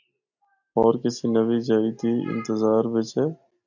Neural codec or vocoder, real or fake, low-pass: none; real; 7.2 kHz